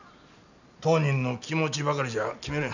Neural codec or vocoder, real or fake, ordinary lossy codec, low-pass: none; real; none; 7.2 kHz